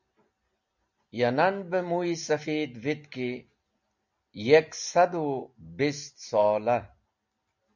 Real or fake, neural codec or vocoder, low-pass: real; none; 7.2 kHz